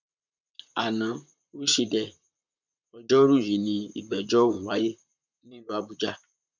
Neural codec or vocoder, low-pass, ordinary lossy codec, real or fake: vocoder, 44.1 kHz, 128 mel bands, Pupu-Vocoder; 7.2 kHz; none; fake